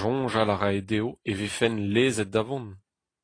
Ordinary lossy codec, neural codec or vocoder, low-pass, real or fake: AAC, 32 kbps; none; 9.9 kHz; real